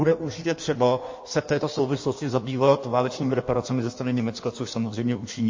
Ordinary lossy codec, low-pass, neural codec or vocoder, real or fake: MP3, 32 kbps; 7.2 kHz; codec, 16 kHz in and 24 kHz out, 1.1 kbps, FireRedTTS-2 codec; fake